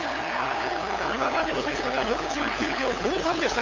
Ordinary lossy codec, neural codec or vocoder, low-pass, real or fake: AAC, 48 kbps; codec, 16 kHz, 8 kbps, FunCodec, trained on LibriTTS, 25 frames a second; 7.2 kHz; fake